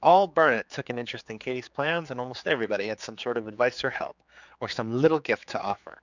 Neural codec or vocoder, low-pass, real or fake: codec, 16 kHz, 4 kbps, X-Codec, HuBERT features, trained on general audio; 7.2 kHz; fake